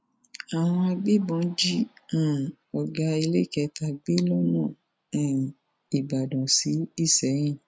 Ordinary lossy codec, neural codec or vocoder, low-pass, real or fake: none; none; none; real